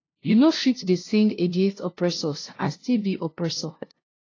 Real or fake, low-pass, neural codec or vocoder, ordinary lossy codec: fake; 7.2 kHz; codec, 16 kHz, 0.5 kbps, FunCodec, trained on LibriTTS, 25 frames a second; AAC, 32 kbps